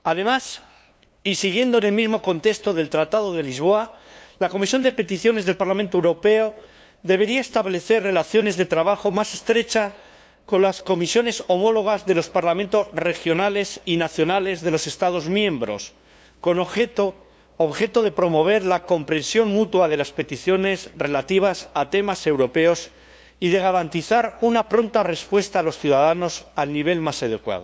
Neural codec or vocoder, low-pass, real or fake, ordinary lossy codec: codec, 16 kHz, 2 kbps, FunCodec, trained on LibriTTS, 25 frames a second; none; fake; none